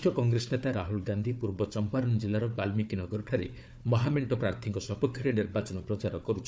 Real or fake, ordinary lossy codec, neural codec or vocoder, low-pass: fake; none; codec, 16 kHz, 4 kbps, FunCodec, trained on Chinese and English, 50 frames a second; none